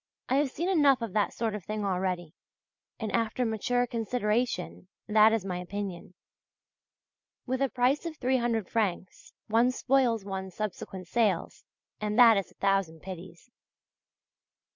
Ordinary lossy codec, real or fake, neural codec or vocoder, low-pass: MP3, 64 kbps; real; none; 7.2 kHz